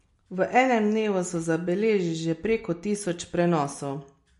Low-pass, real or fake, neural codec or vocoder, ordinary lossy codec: 14.4 kHz; real; none; MP3, 48 kbps